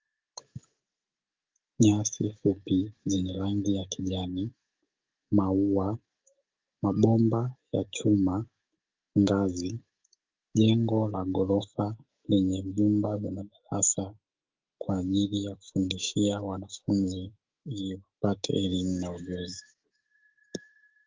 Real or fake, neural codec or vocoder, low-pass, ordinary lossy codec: fake; autoencoder, 48 kHz, 128 numbers a frame, DAC-VAE, trained on Japanese speech; 7.2 kHz; Opus, 32 kbps